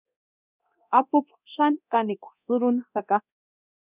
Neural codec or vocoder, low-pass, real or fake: codec, 24 kHz, 0.9 kbps, DualCodec; 3.6 kHz; fake